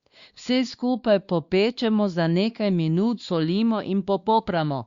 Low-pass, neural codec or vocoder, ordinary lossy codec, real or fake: 7.2 kHz; codec, 16 kHz, 2 kbps, X-Codec, WavLM features, trained on Multilingual LibriSpeech; Opus, 64 kbps; fake